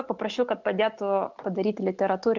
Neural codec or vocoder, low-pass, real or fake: none; 7.2 kHz; real